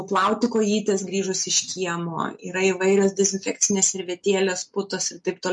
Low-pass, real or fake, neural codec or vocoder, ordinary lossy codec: 10.8 kHz; real; none; MP3, 48 kbps